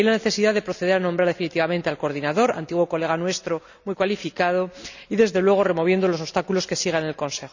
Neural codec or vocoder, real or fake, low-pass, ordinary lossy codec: none; real; 7.2 kHz; none